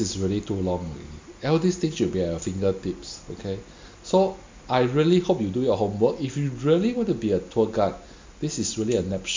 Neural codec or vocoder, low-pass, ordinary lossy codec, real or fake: none; 7.2 kHz; MP3, 64 kbps; real